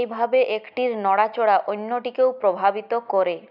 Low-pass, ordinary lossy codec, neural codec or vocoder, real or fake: 5.4 kHz; none; none; real